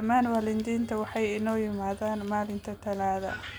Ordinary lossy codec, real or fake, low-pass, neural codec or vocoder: none; real; none; none